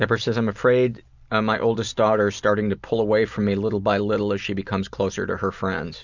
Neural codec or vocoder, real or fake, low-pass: none; real; 7.2 kHz